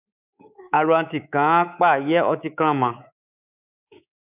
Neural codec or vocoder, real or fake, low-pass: codec, 24 kHz, 3.1 kbps, DualCodec; fake; 3.6 kHz